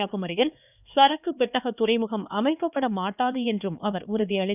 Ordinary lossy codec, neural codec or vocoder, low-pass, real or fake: none; codec, 16 kHz, 2 kbps, X-Codec, HuBERT features, trained on LibriSpeech; 3.6 kHz; fake